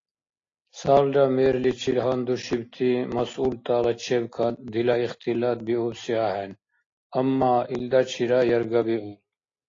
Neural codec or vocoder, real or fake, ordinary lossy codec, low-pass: none; real; AAC, 32 kbps; 7.2 kHz